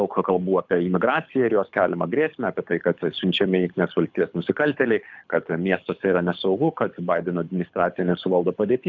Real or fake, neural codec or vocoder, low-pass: fake; codec, 24 kHz, 6 kbps, HILCodec; 7.2 kHz